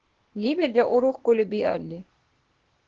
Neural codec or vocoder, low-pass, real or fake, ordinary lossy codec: codec, 16 kHz, 0.8 kbps, ZipCodec; 7.2 kHz; fake; Opus, 16 kbps